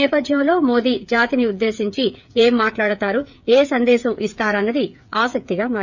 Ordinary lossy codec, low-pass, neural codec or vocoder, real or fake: none; 7.2 kHz; codec, 16 kHz, 8 kbps, FreqCodec, smaller model; fake